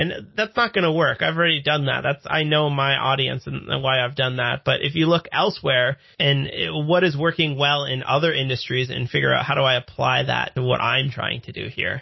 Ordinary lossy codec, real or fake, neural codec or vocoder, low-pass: MP3, 24 kbps; real; none; 7.2 kHz